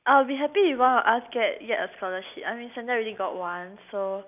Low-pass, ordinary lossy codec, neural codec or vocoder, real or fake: 3.6 kHz; none; none; real